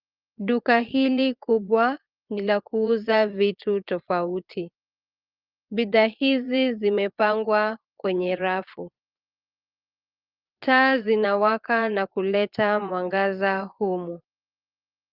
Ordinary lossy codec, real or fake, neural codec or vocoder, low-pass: Opus, 24 kbps; fake; vocoder, 22.05 kHz, 80 mel bands, Vocos; 5.4 kHz